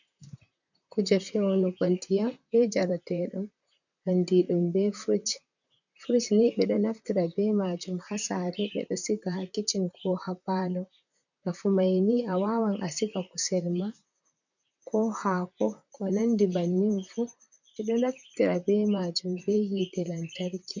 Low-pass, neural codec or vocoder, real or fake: 7.2 kHz; vocoder, 44.1 kHz, 128 mel bands, Pupu-Vocoder; fake